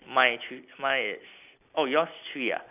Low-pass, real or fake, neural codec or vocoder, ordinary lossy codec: 3.6 kHz; real; none; none